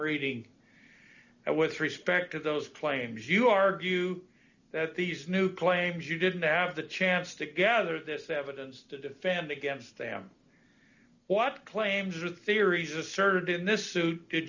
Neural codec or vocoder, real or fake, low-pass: none; real; 7.2 kHz